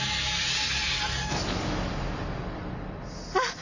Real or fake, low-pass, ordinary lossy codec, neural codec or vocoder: real; 7.2 kHz; none; none